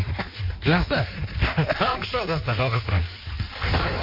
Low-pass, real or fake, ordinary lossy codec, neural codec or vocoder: 5.4 kHz; fake; MP3, 32 kbps; codec, 16 kHz in and 24 kHz out, 1.1 kbps, FireRedTTS-2 codec